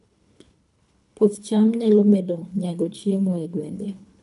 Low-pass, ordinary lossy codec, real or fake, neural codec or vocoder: 10.8 kHz; none; fake; codec, 24 kHz, 3 kbps, HILCodec